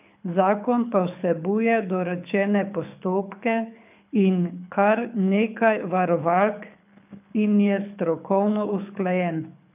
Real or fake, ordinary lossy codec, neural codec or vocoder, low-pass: fake; none; codec, 24 kHz, 6 kbps, HILCodec; 3.6 kHz